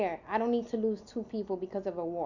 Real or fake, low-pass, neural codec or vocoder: real; 7.2 kHz; none